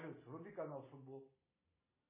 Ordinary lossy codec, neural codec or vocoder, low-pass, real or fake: MP3, 16 kbps; none; 3.6 kHz; real